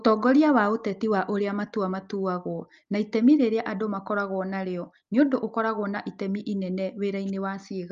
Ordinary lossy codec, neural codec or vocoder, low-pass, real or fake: Opus, 32 kbps; none; 7.2 kHz; real